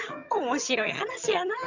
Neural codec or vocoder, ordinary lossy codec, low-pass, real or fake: vocoder, 22.05 kHz, 80 mel bands, HiFi-GAN; Opus, 64 kbps; 7.2 kHz; fake